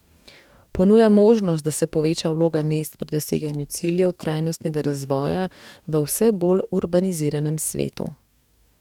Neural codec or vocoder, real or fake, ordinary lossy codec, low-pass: codec, 44.1 kHz, 2.6 kbps, DAC; fake; none; 19.8 kHz